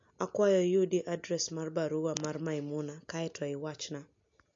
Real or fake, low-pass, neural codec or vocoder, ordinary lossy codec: real; 7.2 kHz; none; MP3, 48 kbps